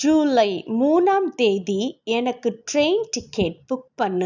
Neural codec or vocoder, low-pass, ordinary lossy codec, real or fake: none; 7.2 kHz; none; real